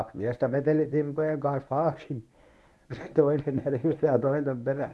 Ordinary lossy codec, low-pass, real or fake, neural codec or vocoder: none; none; fake; codec, 24 kHz, 0.9 kbps, WavTokenizer, medium speech release version 1